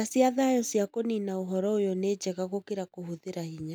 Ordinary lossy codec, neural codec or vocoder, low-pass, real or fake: none; none; none; real